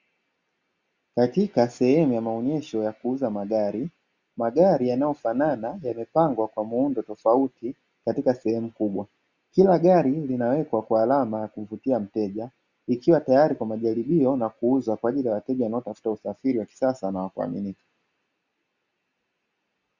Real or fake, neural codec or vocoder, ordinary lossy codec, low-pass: real; none; Opus, 64 kbps; 7.2 kHz